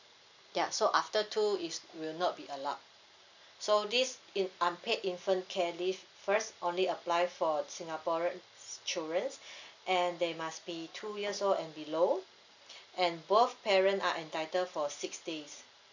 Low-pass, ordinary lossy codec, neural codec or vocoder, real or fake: 7.2 kHz; none; none; real